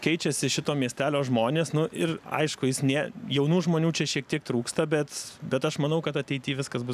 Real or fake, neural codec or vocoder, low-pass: real; none; 14.4 kHz